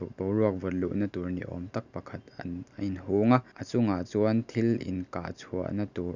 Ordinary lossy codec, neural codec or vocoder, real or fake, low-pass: none; none; real; 7.2 kHz